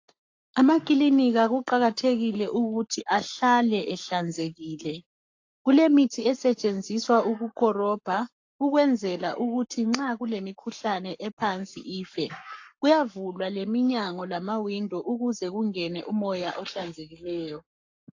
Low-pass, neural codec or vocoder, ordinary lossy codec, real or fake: 7.2 kHz; codec, 44.1 kHz, 7.8 kbps, Pupu-Codec; AAC, 48 kbps; fake